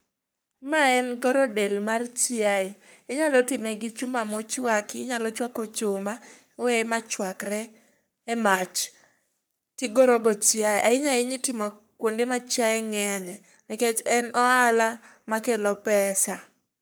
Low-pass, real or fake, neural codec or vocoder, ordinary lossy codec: none; fake; codec, 44.1 kHz, 3.4 kbps, Pupu-Codec; none